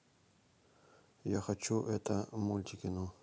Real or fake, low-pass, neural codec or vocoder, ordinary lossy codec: real; none; none; none